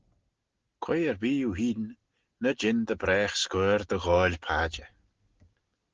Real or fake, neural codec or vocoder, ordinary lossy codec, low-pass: real; none; Opus, 16 kbps; 7.2 kHz